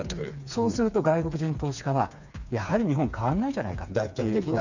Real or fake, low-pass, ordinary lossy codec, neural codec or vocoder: fake; 7.2 kHz; none; codec, 16 kHz, 4 kbps, FreqCodec, smaller model